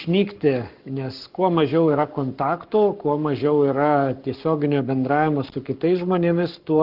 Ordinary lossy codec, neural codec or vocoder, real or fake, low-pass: Opus, 24 kbps; codec, 44.1 kHz, 7.8 kbps, Pupu-Codec; fake; 5.4 kHz